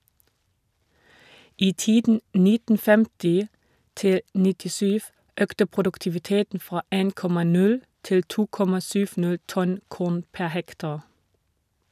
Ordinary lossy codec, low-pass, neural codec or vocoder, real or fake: none; 14.4 kHz; none; real